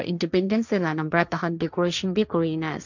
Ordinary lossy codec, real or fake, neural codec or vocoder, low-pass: none; fake; codec, 16 kHz, 1.1 kbps, Voila-Tokenizer; 7.2 kHz